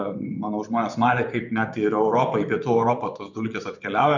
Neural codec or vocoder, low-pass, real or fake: vocoder, 24 kHz, 100 mel bands, Vocos; 7.2 kHz; fake